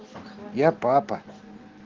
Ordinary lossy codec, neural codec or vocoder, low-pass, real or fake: Opus, 16 kbps; none; 7.2 kHz; real